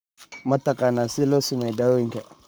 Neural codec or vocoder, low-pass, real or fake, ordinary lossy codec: codec, 44.1 kHz, 7.8 kbps, Pupu-Codec; none; fake; none